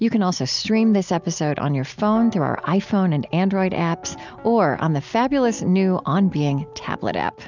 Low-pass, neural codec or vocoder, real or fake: 7.2 kHz; none; real